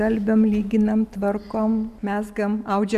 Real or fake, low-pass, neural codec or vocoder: real; 14.4 kHz; none